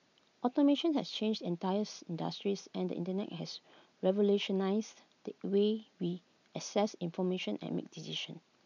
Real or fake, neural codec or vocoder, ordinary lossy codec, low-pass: real; none; none; 7.2 kHz